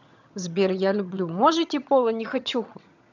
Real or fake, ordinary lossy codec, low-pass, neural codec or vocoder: fake; none; 7.2 kHz; vocoder, 22.05 kHz, 80 mel bands, HiFi-GAN